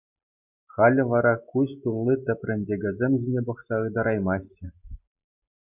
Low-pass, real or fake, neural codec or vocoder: 3.6 kHz; real; none